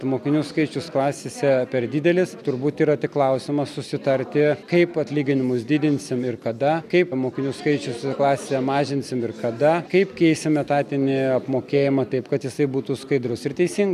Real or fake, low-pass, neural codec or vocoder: real; 14.4 kHz; none